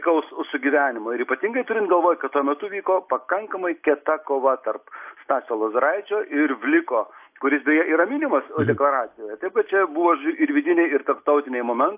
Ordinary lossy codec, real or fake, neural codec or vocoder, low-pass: AAC, 32 kbps; real; none; 3.6 kHz